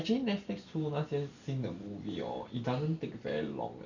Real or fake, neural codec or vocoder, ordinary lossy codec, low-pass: real; none; none; 7.2 kHz